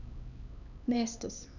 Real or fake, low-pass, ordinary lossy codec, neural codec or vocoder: fake; 7.2 kHz; none; codec, 16 kHz, 2 kbps, X-Codec, HuBERT features, trained on LibriSpeech